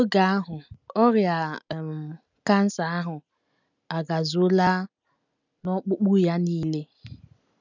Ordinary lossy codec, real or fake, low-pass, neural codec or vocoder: none; real; 7.2 kHz; none